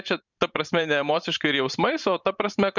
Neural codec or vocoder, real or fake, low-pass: vocoder, 44.1 kHz, 128 mel bands every 512 samples, BigVGAN v2; fake; 7.2 kHz